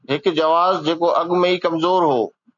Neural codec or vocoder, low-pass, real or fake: none; 7.2 kHz; real